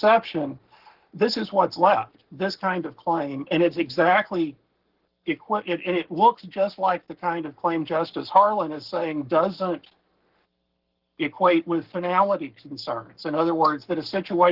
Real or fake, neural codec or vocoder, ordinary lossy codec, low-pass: fake; codec, 44.1 kHz, 7.8 kbps, Pupu-Codec; Opus, 16 kbps; 5.4 kHz